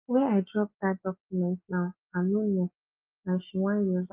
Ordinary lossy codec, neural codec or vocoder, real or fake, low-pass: Opus, 32 kbps; none; real; 3.6 kHz